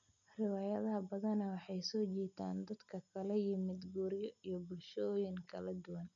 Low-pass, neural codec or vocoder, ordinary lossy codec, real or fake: 7.2 kHz; none; none; real